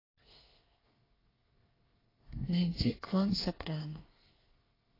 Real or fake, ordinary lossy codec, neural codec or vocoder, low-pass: fake; AAC, 24 kbps; codec, 24 kHz, 1 kbps, SNAC; 5.4 kHz